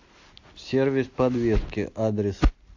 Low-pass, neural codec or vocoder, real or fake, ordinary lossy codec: 7.2 kHz; none; real; AAC, 48 kbps